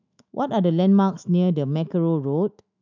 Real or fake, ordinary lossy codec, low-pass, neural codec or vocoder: fake; none; 7.2 kHz; autoencoder, 48 kHz, 128 numbers a frame, DAC-VAE, trained on Japanese speech